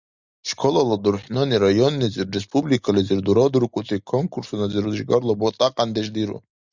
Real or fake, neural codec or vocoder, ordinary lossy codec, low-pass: real; none; Opus, 64 kbps; 7.2 kHz